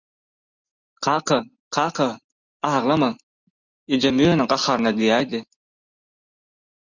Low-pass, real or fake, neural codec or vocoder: 7.2 kHz; real; none